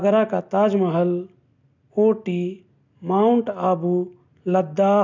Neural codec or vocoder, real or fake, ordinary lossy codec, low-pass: none; real; none; 7.2 kHz